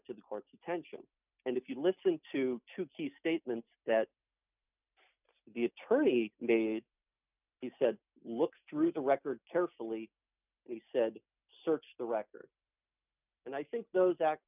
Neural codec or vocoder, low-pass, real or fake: none; 3.6 kHz; real